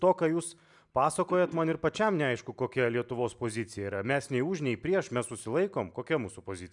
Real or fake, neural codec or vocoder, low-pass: real; none; 10.8 kHz